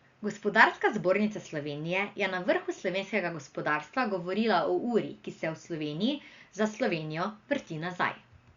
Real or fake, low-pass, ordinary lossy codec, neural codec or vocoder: real; 7.2 kHz; Opus, 64 kbps; none